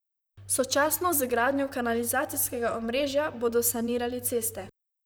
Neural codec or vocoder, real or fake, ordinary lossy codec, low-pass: vocoder, 44.1 kHz, 128 mel bands, Pupu-Vocoder; fake; none; none